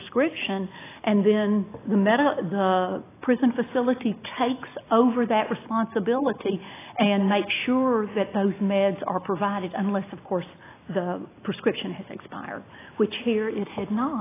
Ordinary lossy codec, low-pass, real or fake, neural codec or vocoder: AAC, 16 kbps; 3.6 kHz; real; none